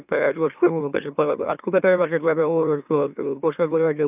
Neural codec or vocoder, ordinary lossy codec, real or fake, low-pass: autoencoder, 44.1 kHz, a latent of 192 numbers a frame, MeloTTS; none; fake; 3.6 kHz